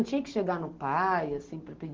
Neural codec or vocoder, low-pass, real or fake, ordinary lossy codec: none; 7.2 kHz; real; Opus, 16 kbps